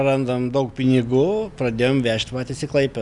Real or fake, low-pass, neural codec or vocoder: real; 10.8 kHz; none